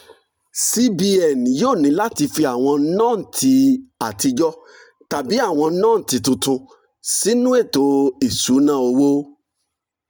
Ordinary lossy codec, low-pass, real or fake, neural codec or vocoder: none; none; real; none